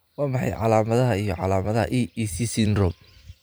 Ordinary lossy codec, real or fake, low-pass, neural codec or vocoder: none; fake; none; vocoder, 44.1 kHz, 128 mel bands every 512 samples, BigVGAN v2